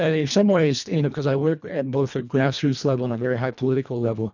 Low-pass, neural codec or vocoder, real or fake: 7.2 kHz; codec, 24 kHz, 1.5 kbps, HILCodec; fake